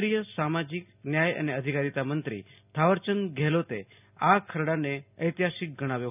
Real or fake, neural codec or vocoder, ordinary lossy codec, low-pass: real; none; none; 3.6 kHz